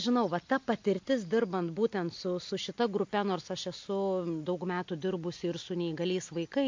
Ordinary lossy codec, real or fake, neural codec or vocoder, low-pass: MP3, 48 kbps; real; none; 7.2 kHz